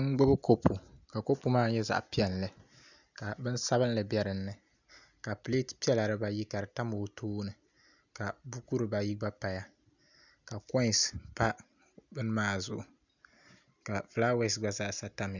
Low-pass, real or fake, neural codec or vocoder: 7.2 kHz; real; none